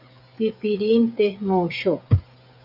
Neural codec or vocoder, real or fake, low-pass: codec, 16 kHz, 16 kbps, FreqCodec, smaller model; fake; 5.4 kHz